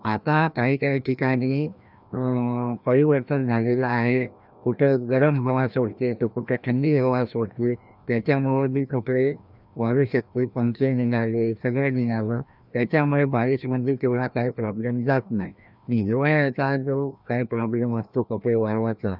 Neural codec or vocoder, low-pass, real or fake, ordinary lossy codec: codec, 16 kHz, 1 kbps, FreqCodec, larger model; 5.4 kHz; fake; none